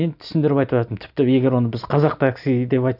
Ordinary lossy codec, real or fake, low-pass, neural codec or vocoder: none; real; 5.4 kHz; none